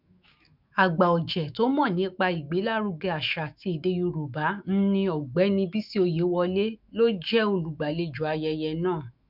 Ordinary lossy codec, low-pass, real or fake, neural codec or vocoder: none; 5.4 kHz; fake; autoencoder, 48 kHz, 128 numbers a frame, DAC-VAE, trained on Japanese speech